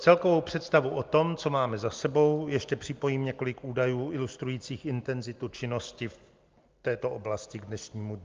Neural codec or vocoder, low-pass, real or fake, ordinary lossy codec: none; 7.2 kHz; real; Opus, 32 kbps